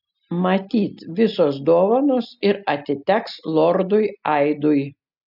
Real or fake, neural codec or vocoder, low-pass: real; none; 5.4 kHz